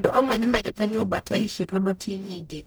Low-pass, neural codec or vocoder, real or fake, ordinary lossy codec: none; codec, 44.1 kHz, 0.9 kbps, DAC; fake; none